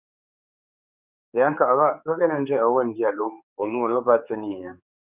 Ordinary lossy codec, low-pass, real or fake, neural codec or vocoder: Opus, 64 kbps; 3.6 kHz; fake; codec, 16 kHz, 4 kbps, X-Codec, HuBERT features, trained on general audio